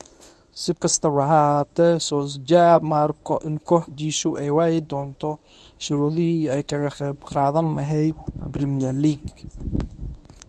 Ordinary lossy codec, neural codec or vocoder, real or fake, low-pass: none; codec, 24 kHz, 0.9 kbps, WavTokenizer, medium speech release version 1; fake; none